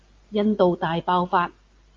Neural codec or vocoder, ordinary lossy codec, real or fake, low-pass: none; Opus, 24 kbps; real; 7.2 kHz